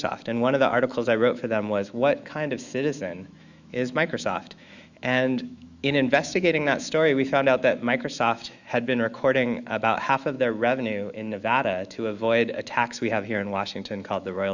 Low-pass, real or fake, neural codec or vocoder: 7.2 kHz; fake; autoencoder, 48 kHz, 128 numbers a frame, DAC-VAE, trained on Japanese speech